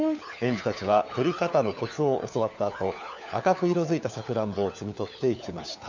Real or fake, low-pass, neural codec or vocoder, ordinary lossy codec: fake; 7.2 kHz; codec, 16 kHz, 4 kbps, FunCodec, trained on LibriTTS, 50 frames a second; none